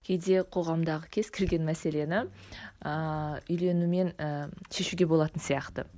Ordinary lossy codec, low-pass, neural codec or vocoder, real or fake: none; none; none; real